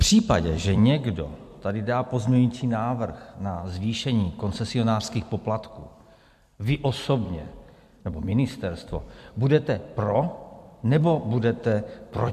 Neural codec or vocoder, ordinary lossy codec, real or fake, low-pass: vocoder, 44.1 kHz, 128 mel bands every 256 samples, BigVGAN v2; MP3, 64 kbps; fake; 14.4 kHz